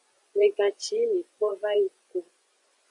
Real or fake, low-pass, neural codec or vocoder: fake; 10.8 kHz; vocoder, 24 kHz, 100 mel bands, Vocos